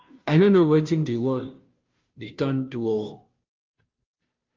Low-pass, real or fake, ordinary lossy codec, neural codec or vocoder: 7.2 kHz; fake; Opus, 24 kbps; codec, 16 kHz, 0.5 kbps, FunCodec, trained on Chinese and English, 25 frames a second